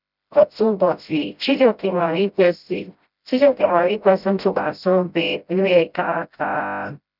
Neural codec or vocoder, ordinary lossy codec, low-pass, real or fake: codec, 16 kHz, 0.5 kbps, FreqCodec, smaller model; none; 5.4 kHz; fake